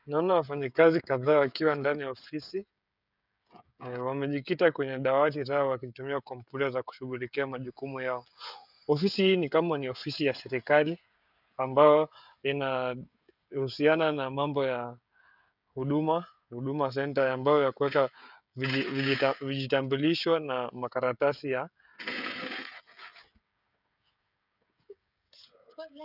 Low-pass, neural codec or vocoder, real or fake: 5.4 kHz; codec, 16 kHz, 16 kbps, FreqCodec, smaller model; fake